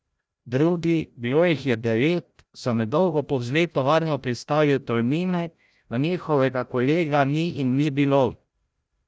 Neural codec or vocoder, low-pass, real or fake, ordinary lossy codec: codec, 16 kHz, 0.5 kbps, FreqCodec, larger model; none; fake; none